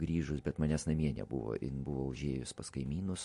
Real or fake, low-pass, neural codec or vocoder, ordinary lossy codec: real; 14.4 kHz; none; MP3, 48 kbps